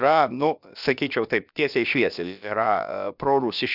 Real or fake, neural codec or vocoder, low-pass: fake; codec, 16 kHz, about 1 kbps, DyCAST, with the encoder's durations; 5.4 kHz